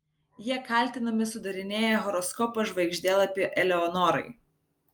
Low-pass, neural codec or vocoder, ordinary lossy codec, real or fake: 19.8 kHz; none; Opus, 32 kbps; real